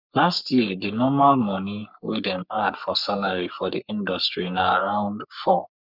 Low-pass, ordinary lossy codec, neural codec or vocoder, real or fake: 5.4 kHz; none; codec, 44.1 kHz, 3.4 kbps, Pupu-Codec; fake